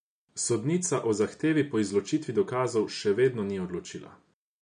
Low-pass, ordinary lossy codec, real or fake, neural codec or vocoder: 9.9 kHz; none; real; none